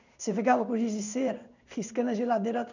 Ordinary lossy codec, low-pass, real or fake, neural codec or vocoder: none; 7.2 kHz; fake; codec, 16 kHz in and 24 kHz out, 1 kbps, XY-Tokenizer